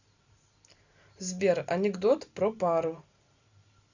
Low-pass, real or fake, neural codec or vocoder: 7.2 kHz; real; none